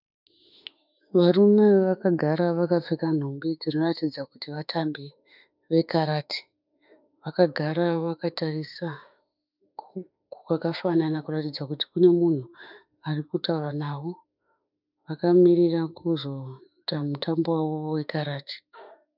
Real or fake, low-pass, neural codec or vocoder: fake; 5.4 kHz; autoencoder, 48 kHz, 32 numbers a frame, DAC-VAE, trained on Japanese speech